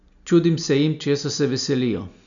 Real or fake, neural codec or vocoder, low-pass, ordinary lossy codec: real; none; 7.2 kHz; none